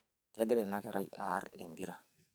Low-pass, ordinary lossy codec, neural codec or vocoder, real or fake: none; none; codec, 44.1 kHz, 2.6 kbps, SNAC; fake